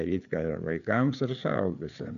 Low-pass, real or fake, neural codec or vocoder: 7.2 kHz; fake; codec, 16 kHz, 4 kbps, FreqCodec, larger model